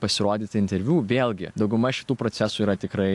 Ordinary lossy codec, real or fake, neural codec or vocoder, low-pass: AAC, 64 kbps; real; none; 10.8 kHz